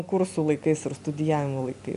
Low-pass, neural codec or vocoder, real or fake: 10.8 kHz; none; real